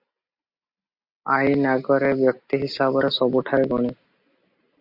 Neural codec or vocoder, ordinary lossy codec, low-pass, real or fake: none; Opus, 64 kbps; 5.4 kHz; real